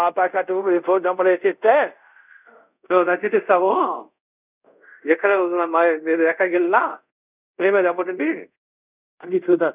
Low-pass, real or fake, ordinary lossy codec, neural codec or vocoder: 3.6 kHz; fake; none; codec, 24 kHz, 0.5 kbps, DualCodec